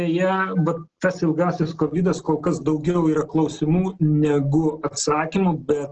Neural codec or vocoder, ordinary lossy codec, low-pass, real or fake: none; Opus, 16 kbps; 7.2 kHz; real